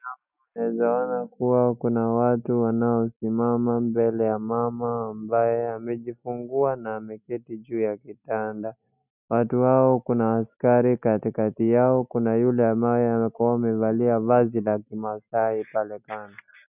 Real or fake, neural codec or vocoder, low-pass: real; none; 3.6 kHz